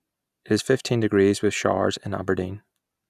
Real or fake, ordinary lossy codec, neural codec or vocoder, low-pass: real; none; none; 14.4 kHz